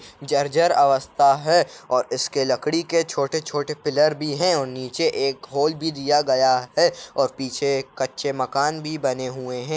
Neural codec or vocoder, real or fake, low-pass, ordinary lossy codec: none; real; none; none